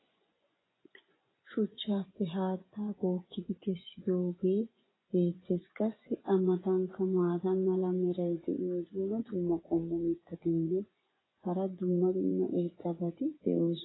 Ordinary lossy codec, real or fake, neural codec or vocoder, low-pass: AAC, 16 kbps; fake; vocoder, 24 kHz, 100 mel bands, Vocos; 7.2 kHz